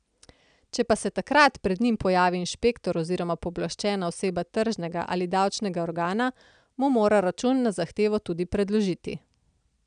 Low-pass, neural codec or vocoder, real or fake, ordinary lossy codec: 9.9 kHz; none; real; none